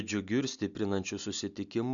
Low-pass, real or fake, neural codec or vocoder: 7.2 kHz; real; none